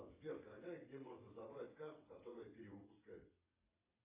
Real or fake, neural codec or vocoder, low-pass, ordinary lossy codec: fake; vocoder, 22.05 kHz, 80 mel bands, WaveNeXt; 3.6 kHz; AAC, 24 kbps